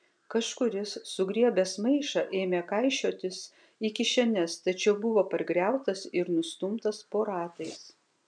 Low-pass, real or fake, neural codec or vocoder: 9.9 kHz; real; none